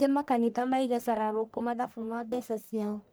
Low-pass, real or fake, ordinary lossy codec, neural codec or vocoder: none; fake; none; codec, 44.1 kHz, 1.7 kbps, Pupu-Codec